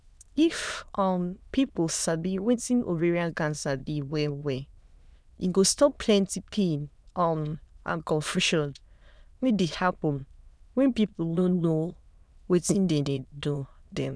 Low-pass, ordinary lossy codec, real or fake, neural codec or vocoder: none; none; fake; autoencoder, 22.05 kHz, a latent of 192 numbers a frame, VITS, trained on many speakers